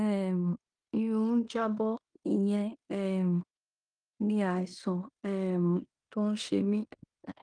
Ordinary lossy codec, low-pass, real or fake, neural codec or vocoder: Opus, 32 kbps; 9.9 kHz; fake; codec, 16 kHz in and 24 kHz out, 0.9 kbps, LongCat-Audio-Codec, fine tuned four codebook decoder